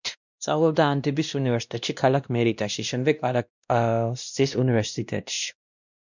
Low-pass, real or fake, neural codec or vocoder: 7.2 kHz; fake; codec, 16 kHz, 1 kbps, X-Codec, WavLM features, trained on Multilingual LibriSpeech